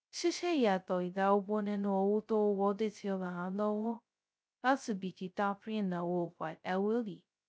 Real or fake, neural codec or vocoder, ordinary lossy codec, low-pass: fake; codec, 16 kHz, 0.2 kbps, FocalCodec; none; none